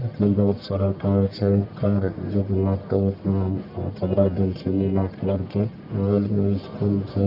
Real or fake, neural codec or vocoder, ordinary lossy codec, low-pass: fake; codec, 44.1 kHz, 1.7 kbps, Pupu-Codec; none; 5.4 kHz